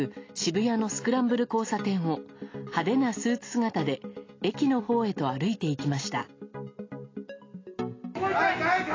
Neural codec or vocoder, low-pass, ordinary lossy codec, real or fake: none; 7.2 kHz; AAC, 32 kbps; real